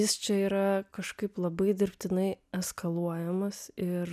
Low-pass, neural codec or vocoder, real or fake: 14.4 kHz; none; real